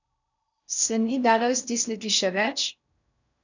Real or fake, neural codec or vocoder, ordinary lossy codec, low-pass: fake; codec, 16 kHz in and 24 kHz out, 0.6 kbps, FocalCodec, streaming, 4096 codes; none; 7.2 kHz